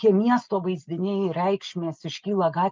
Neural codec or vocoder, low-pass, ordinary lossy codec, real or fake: none; 7.2 kHz; Opus, 32 kbps; real